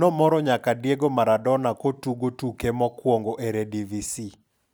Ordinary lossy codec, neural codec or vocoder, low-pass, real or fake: none; none; none; real